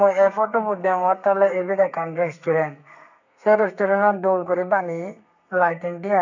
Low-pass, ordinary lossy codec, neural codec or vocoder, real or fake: 7.2 kHz; none; codec, 32 kHz, 1.9 kbps, SNAC; fake